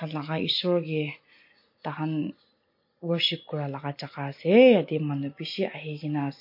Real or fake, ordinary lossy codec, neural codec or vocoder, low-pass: real; MP3, 32 kbps; none; 5.4 kHz